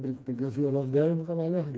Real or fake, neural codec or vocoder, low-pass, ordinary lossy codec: fake; codec, 16 kHz, 2 kbps, FreqCodec, smaller model; none; none